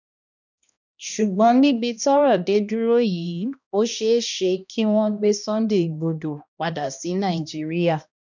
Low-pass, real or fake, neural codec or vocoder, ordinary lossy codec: 7.2 kHz; fake; codec, 16 kHz, 1 kbps, X-Codec, HuBERT features, trained on balanced general audio; none